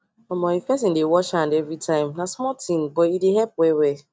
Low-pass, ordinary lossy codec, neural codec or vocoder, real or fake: none; none; none; real